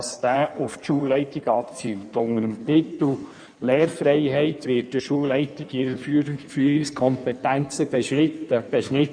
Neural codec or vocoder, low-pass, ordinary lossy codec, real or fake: codec, 16 kHz in and 24 kHz out, 1.1 kbps, FireRedTTS-2 codec; 9.9 kHz; none; fake